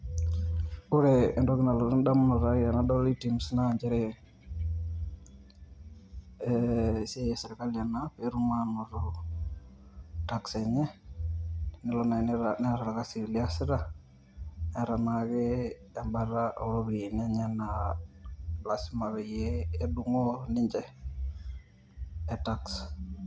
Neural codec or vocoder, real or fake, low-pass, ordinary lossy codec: none; real; none; none